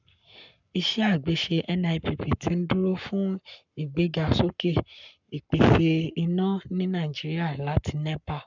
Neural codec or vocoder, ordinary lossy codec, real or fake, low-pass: codec, 44.1 kHz, 7.8 kbps, Pupu-Codec; none; fake; 7.2 kHz